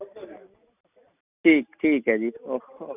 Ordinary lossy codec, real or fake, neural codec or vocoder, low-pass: none; real; none; 3.6 kHz